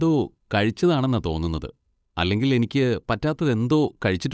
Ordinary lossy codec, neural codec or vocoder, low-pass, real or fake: none; codec, 16 kHz, 8 kbps, FunCodec, trained on Chinese and English, 25 frames a second; none; fake